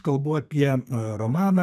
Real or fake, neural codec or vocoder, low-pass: fake; codec, 32 kHz, 1.9 kbps, SNAC; 14.4 kHz